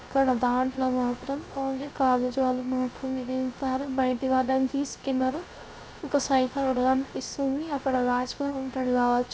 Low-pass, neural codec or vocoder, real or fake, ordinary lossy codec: none; codec, 16 kHz, 0.3 kbps, FocalCodec; fake; none